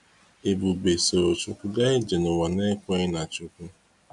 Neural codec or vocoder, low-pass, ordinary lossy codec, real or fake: none; 10.8 kHz; none; real